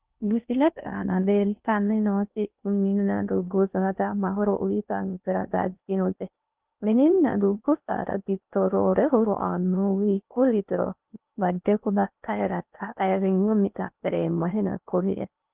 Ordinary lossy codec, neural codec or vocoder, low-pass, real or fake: Opus, 24 kbps; codec, 16 kHz in and 24 kHz out, 0.6 kbps, FocalCodec, streaming, 2048 codes; 3.6 kHz; fake